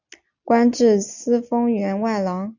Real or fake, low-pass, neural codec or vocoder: real; 7.2 kHz; none